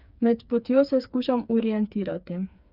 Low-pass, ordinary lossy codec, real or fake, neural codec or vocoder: 5.4 kHz; none; fake; codec, 16 kHz, 4 kbps, FreqCodec, smaller model